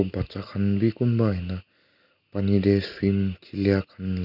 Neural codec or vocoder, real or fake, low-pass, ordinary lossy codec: none; real; 5.4 kHz; none